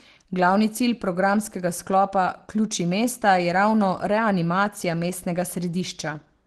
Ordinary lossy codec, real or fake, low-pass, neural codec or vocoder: Opus, 16 kbps; real; 10.8 kHz; none